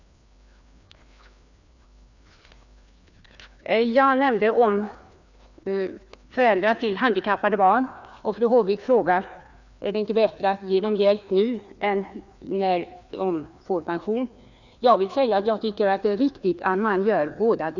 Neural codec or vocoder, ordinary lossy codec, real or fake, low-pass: codec, 16 kHz, 2 kbps, FreqCodec, larger model; none; fake; 7.2 kHz